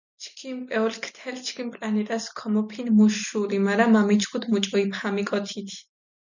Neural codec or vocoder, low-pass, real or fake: none; 7.2 kHz; real